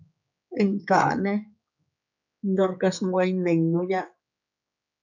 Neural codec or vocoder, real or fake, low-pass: codec, 16 kHz, 4 kbps, X-Codec, HuBERT features, trained on general audio; fake; 7.2 kHz